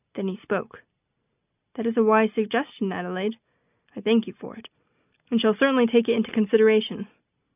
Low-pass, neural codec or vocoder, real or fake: 3.6 kHz; none; real